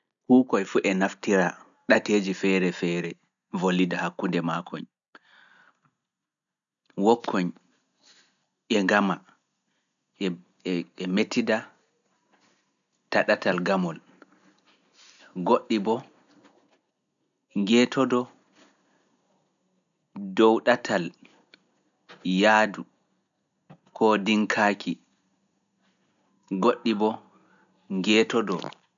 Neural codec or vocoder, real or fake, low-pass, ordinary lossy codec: none; real; 7.2 kHz; none